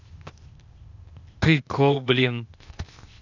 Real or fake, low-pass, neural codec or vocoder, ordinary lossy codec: fake; 7.2 kHz; codec, 16 kHz, 0.8 kbps, ZipCodec; none